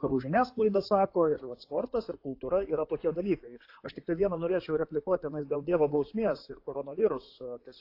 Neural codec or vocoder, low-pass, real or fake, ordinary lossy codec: codec, 16 kHz in and 24 kHz out, 2.2 kbps, FireRedTTS-2 codec; 5.4 kHz; fake; AAC, 32 kbps